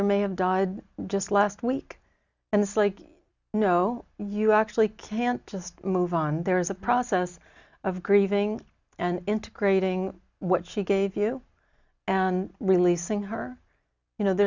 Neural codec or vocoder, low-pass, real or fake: none; 7.2 kHz; real